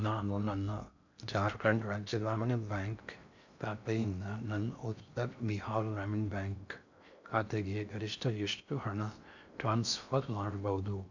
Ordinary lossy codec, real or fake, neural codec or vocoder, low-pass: none; fake; codec, 16 kHz in and 24 kHz out, 0.6 kbps, FocalCodec, streaming, 2048 codes; 7.2 kHz